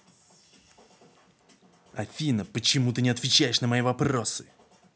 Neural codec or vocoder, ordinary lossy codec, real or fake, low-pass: none; none; real; none